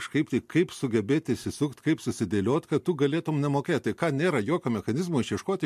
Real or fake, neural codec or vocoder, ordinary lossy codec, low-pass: real; none; MP3, 64 kbps; 14.4 kHz